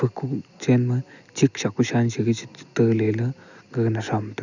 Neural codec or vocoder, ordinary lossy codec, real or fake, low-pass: none; none; real; 7.2 kHz